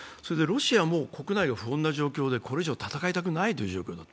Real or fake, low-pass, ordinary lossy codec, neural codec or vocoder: real; none; none; none